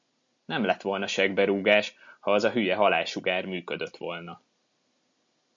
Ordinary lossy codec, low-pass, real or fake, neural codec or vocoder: MP3, 48 kbps; 7.2 kHz; real; none